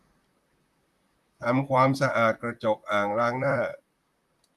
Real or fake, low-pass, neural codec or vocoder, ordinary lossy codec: fake; 14.4 kHz; vocoder, 44.1 kHz, 128 mel bands, Pupu-Vocoder; Opus, 64 kbps